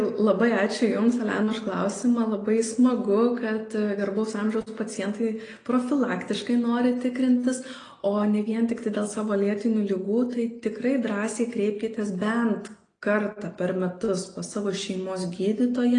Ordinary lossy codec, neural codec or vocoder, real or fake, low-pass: AAC, 32 kbps; none; real; 9.9 kHz